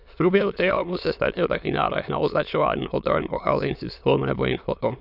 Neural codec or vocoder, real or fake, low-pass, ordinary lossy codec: autoencoder, 22.05 kHz, a latent of 192 numbers a frame, VITS, trained on many speakers; fake; 5.4 kHz; none